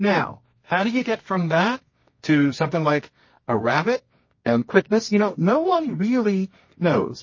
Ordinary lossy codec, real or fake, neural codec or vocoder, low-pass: MP3, 32 kbps; fake; codec, 24 kHz, 0.9 kbps, WavTokenizer, medium music audio release; 7.2 kHz